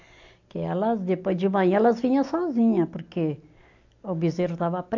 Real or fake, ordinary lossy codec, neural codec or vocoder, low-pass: real; AAC, 48 kbps; none; 7.2 kHz